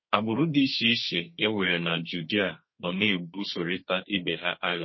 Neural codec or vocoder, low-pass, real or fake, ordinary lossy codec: codec, 32 kHz, 1.9 kbps, SNAC; 7.2 kHz; fake; MP3, 24 kbps